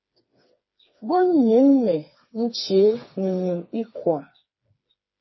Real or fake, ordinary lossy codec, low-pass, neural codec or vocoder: fake; MP3, 24 kbps; 7.2 kHz; codec, 16 kHz, 4 kbps, FreqCodec, smaller model